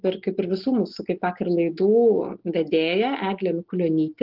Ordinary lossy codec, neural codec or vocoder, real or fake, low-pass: Opus, 16 kbps; none; real; 5.4 kHz